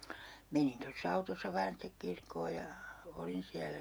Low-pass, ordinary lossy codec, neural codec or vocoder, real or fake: none; none; vocoder, 48 kHz, 128 mel bands, Vocos; fake